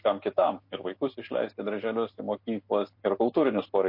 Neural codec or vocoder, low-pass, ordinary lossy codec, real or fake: none; 5.4 kHz; MP3, 32 kbps; real